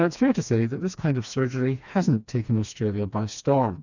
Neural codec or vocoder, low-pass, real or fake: codec, 16 kHz, 2 kbps, FreqCodec, smaller model; 7.2 kHz; fake